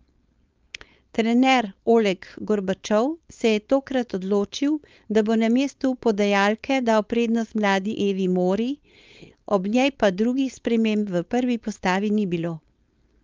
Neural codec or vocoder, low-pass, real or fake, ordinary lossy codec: codec, 16 kHz, 4.8 kbps, FACodec; 7.2 kHz; fake; Opus, 24 kbps